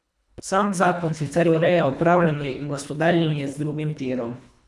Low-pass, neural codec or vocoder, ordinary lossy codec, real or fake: none; codec, 24 kHz, 1.5 kbps, HILCodec; none; fake